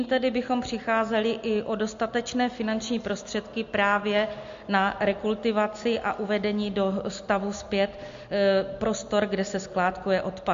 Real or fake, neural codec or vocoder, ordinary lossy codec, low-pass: real; none; MP3, 48 kbps; 7.2 kHz